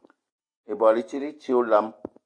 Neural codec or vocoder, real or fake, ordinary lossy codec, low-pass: none; real; AAC, 48 kbps; 9.9 kHz